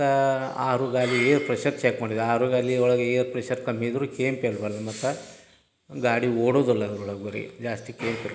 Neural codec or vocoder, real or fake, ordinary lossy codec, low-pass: none; real; none; none